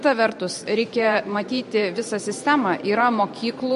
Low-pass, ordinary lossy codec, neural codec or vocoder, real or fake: 14.4 kHz; MP3, 48 kbps; vocoder, 44.1 kHz, 128 mel bands every 512 samples, BigVGAN v2; fake